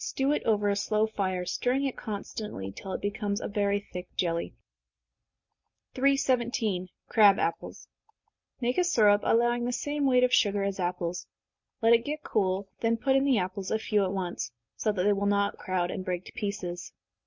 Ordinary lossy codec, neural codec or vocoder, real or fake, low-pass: MP3, 48 kbps; none; real; 7.2 kHz